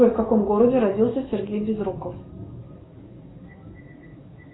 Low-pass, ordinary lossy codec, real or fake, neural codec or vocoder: 7.2 kHz; AAC, 16 kbps; fake; codec, 44.1 kHz, 7.8 kbps, DAC